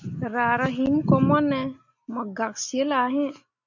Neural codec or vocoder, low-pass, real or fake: none; 7.2 kHz; real